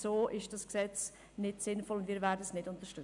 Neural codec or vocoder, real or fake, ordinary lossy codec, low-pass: none; real; none; 10.8 kHz